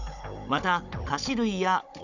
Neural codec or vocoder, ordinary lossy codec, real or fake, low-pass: codec, 16 kHz, 16 kbps, FunCodec, trained on Chinese and English, 50 frames a second; none; fake; 7.2 kHz